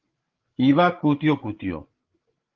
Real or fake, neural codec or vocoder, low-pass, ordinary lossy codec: fake; codec, 16 kHz, 8 kbps, FreqCodec, larger model; 7.2 kHz; Opus, 16 kbps